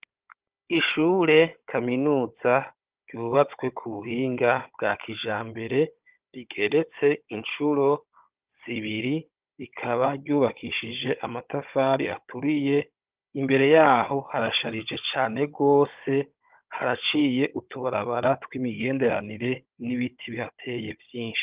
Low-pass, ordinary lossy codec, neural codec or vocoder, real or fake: 3.6 kHz; Opus, 32 kbps; codec, 16 kHz, 16 kbps, FunCodec, trained on Chinese and English, 50 frames a second; fake